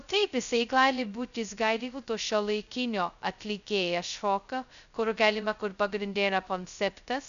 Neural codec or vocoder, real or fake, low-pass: codec, 16 kHz, 0.2 kbps, FocalCodec; fake; 7.2 kHz